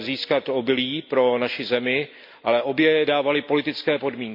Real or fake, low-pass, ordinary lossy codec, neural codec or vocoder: real; 5.4 kHz; MP3, 48 kbps; none